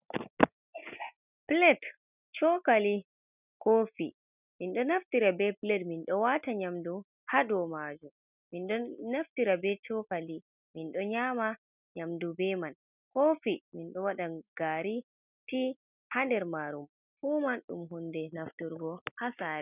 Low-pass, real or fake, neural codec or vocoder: 3.6 kHz; real; none